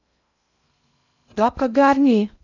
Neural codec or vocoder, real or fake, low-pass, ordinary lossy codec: codec, 16 kHz in and 24 kHz out, 0.6 kbps, FocalCodec, streaming, 2048 codes; fake; 7.2 kHz; none